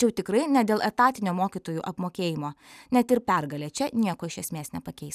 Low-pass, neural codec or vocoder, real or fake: 14.4 kHz; none; real